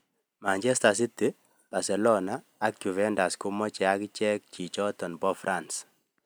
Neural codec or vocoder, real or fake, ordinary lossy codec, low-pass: none; real; none; none